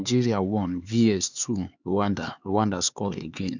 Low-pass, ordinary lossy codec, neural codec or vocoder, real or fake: 7.2 kHz; none; codec, 16 kHz, 4 kbps, X-Codec, HuBERT features, trained on LibriSpeech; fake